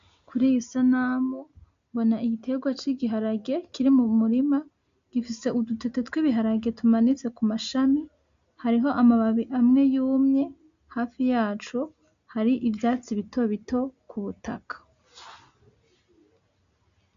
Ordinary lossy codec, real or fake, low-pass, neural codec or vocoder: MP3, 64 kbps; real; 7.2 kHz; none